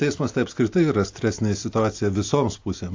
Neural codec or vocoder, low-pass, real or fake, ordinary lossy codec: none; 7.2 kHz; real; AAC, 48 kbps